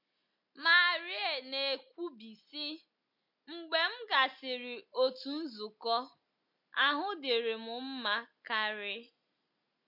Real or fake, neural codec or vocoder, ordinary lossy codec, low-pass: real; none; MP3, 32 kbps; 5.4 kHz